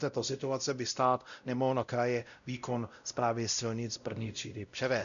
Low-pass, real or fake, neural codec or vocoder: 7.2 kHz; fake; codec, 16 kHz, 0.5 kbps, X-Codec, WavLM features, trained on Multilingual LibriSpeech